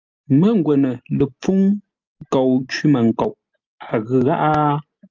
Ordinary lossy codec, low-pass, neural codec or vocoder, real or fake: Opus, 32 kbps; 7.2 kHz; none; real